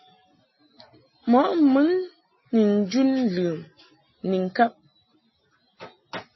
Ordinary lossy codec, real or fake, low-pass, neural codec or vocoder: MP3, 24 kbps; real; 7.2 kHz; none